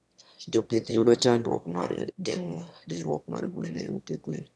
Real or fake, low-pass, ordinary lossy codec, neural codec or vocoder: fake; none; none; autoencoder, 22.05 kHz, a latent of 192 numbers a frame, VITS, trained on one speaker